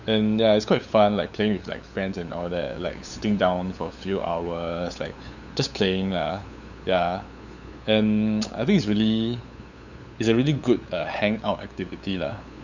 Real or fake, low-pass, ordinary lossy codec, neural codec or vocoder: fake; 7.2 kHz; none; codec, 16 kHz, 8 kbps, FunCodec, trained on LibriTTS, 25 frames a second